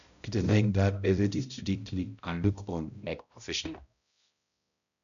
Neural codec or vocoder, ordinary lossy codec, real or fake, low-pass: codec, 16 kHz, 0.5 kbps, X-Codec, HuBERT features, trained on balanced general audio; none; fake; 7.2 kHz